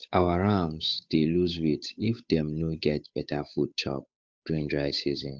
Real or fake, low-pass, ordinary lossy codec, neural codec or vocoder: fake; none; none; codec, 16 kHz, 8 kbps, FunCodec, trained on Chinese and English, 25 frames a second